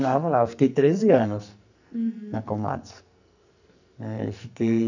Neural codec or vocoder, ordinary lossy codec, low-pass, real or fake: codec, 44.1 kHz, 2.6 kbps, SNAC; none; 7.2 kHz; fake